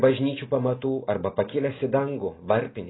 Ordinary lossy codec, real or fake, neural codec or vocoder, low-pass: AAC, 16 kbps; real; none; 7.2 kHz